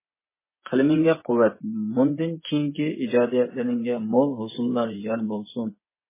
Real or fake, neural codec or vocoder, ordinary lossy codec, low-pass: fake; vocoder, 24 kHz, 100 mel bands, Vocos; MP3, 16 kbps; 3.6 kHz